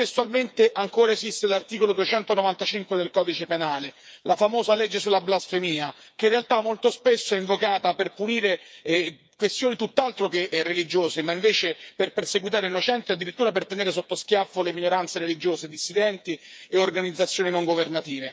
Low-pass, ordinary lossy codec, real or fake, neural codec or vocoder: none; none; fake; codec, 16 kHz, 4 kbps, FreqCodec, smaller model